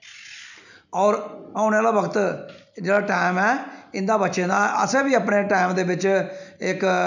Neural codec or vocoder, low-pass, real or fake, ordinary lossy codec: none; 7.2 kHz; real; none